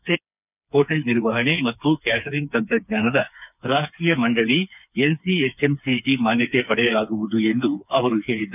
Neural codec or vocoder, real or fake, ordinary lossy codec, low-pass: codec, 16 kHz, 4 kbps, FreqCodec, smaller model; fake; none; 3.6 kHz